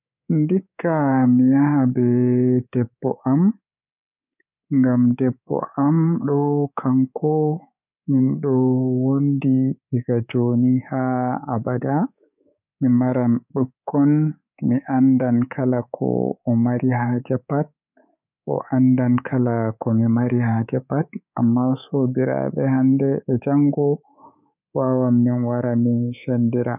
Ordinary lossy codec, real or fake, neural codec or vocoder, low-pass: none; fake; codec, 24 kHz, 3.1 kbps, DualCodec; 3.6 kHz